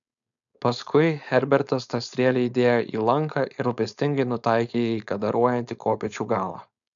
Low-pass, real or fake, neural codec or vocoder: 7.2 kHz; fake; codec, 16 kHz, 4.8 kbps, FACodec